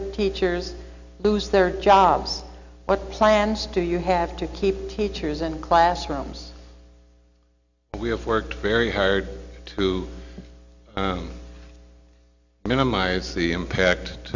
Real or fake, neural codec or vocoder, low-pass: real; none; 7.2 kHz